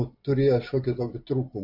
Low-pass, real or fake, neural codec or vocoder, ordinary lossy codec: 5.4 kHz; real; none; AAC, 48 kbps